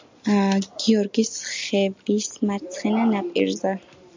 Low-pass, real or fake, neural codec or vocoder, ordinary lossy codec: 7.2 kHz; real; none; MP3, 64 kbps